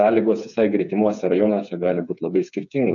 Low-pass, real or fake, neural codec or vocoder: 7.2 kHz; fake; codec, 16 kHz, 4 kbps, FreqCodec, smaller model